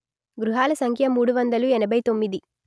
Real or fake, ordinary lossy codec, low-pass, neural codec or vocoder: real; none; none; none